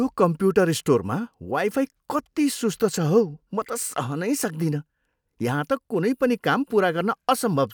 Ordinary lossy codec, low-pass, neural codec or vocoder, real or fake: none; none; none; real